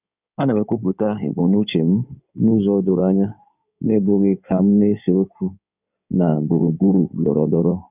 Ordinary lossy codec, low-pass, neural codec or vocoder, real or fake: none; 3.6 kHz; codec, 16 kHz in and 24 kHz out, 2.2 kbps, FireRedTTS-2 codec; fake